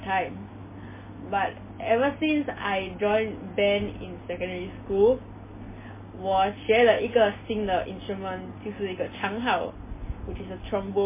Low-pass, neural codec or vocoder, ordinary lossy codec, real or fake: 3.6 kHz; none; MP3, 16 kbps; real